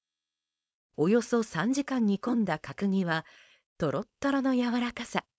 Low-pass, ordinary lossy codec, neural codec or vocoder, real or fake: none; none; codec, 16 kHz, 4.8 kbps, FACodec; fake